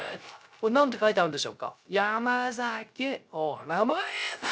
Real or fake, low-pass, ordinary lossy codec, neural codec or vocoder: fake; none; none; codec, 16 kHz, 0.3 kbps, FocalCodec